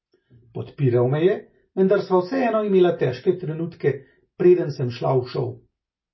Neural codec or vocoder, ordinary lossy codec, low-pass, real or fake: none; MP3, 24 kbps; 7.2 kHz; real